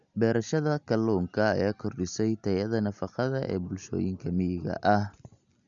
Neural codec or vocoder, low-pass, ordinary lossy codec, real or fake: none; 7.2 kHz; MP3, 96 kbps; real